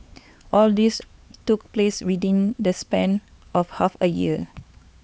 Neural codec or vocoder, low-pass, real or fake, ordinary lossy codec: codec, 16 kHz, 4 kbps, X-Codec, HuBERT features, trained on LibriSpeech; none; fake; none